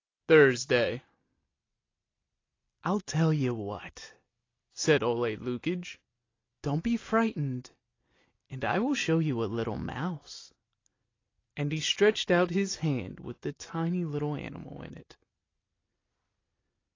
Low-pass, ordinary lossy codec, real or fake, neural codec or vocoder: 7.2 kHz; AAC, 32 kbps; real; none